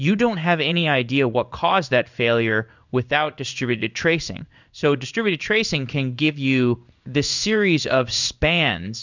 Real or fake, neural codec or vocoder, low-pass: fake; codec, 16 kHz in and 24 kHz out, 1 kbps, XY-Tokenizer; 7.2 kHz